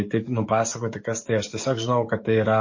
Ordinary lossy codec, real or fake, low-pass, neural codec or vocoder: MP3, 32 kbps; fake; 7.2 kHz; codec, 44.1 kHz, 7.8 kbps, DAC